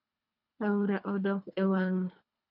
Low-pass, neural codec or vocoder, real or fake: 5.4 kHz; codec, 24 kHz, 3 kbps, HILCodec; fake